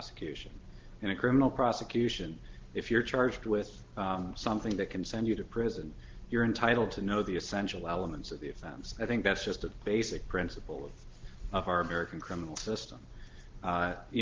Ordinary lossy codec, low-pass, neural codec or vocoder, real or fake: Opus, 16 kbps; 7.2 kHz; none; real